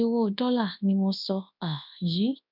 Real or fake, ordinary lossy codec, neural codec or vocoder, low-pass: fake; none; codec, 24 kHz, 0.9 kbps, WavTokenizer, large speech release; 5.4 kHz